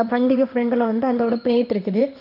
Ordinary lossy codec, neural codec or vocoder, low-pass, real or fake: none; codec, 16 kHz in and 24 kHz out, 1 kbps, XY-Tokenizer; 5.4 kHz; fake